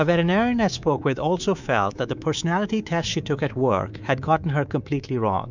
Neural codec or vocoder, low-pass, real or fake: codec, 24 kHz, 3.1 kbps, DualCodec; 7.2 kHz; fake